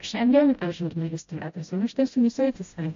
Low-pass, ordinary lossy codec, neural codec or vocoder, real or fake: 7.2 kHz; AAC, 48 kbps; codec, 16 kHz, 0.5 kbps, FreqCodec, smaller model; fake